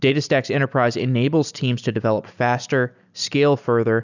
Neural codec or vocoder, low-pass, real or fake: none; 7.2 kHz; real